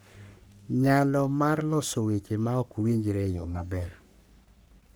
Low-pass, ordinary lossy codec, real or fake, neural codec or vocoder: none; none; fake; codec, 44.1 kHz, 3.4 kbps, Pupu-Codec